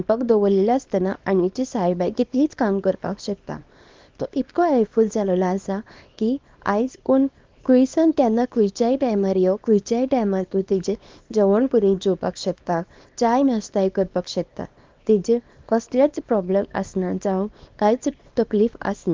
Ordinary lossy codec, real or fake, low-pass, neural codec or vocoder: Opus, 32 kbps; fake; 7.2 kHz; codec, 24 kHz, 0.9 kbps, WavTokenizer, small release